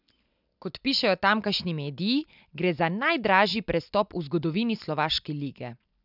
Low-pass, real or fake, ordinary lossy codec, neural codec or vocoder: 5.4 kHz; real; none; none